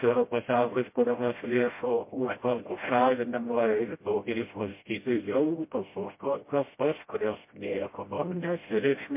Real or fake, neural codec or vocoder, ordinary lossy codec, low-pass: fake; codec, 16 kHz, 0.5 kbps, FreqCodec, smaller model; MP3, 24 kbps; 3.6 kHz